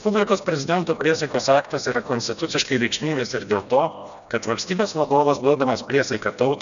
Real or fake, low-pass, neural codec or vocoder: fake; 7.2 kHz; codec, 16 kHz, 1 kbps, FreqCodec, smaller model